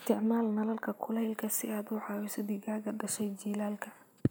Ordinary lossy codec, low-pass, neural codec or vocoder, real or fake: none; none; none; real